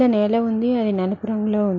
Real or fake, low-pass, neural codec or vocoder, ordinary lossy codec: real; 7.2 kHz; none; none